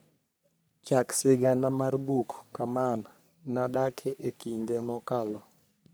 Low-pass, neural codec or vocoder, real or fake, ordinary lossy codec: none; codec, 44.1 kHz, 3.4 kbps, Pupu-Codec; fake; none